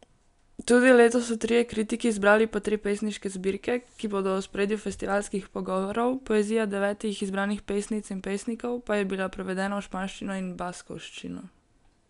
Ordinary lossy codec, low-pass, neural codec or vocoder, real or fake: none; 10.8 kHz; none; real